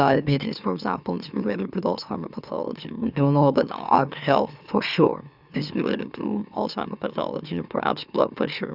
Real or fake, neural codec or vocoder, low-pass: fake; autoencoder, 44.1 kHz, a latent of 192 numbers a frame, MeloTTS; 5.4 kHz